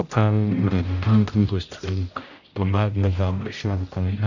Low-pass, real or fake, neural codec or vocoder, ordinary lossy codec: 7.2 kHz; fake; codec, 16 kHz, 0.5 kbps, X-Codec, HuBERT features, trained on general audio; none